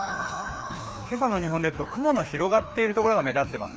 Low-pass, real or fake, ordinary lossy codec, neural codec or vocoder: none; fake; none; codec, 16 kHz, 2 kbps, FreqCodec, larger model